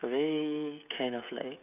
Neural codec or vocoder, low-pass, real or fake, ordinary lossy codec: codec, 16 kHz, 16 kbps, FreqCodec, smaller model; 3.6 kHz; fake; none